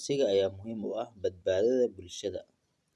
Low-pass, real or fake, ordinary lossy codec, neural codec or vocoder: none; real; none; none